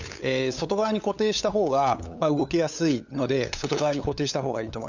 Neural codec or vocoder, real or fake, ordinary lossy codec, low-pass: codec, 16 kHz, 8 kbps, FunCodec, trained on LibriTTS, 25 frames a second; fake; none; 7.2 kHz